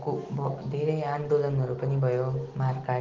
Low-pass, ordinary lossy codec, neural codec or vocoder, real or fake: 7.2 kHz; Opus, 16 kbps; none; real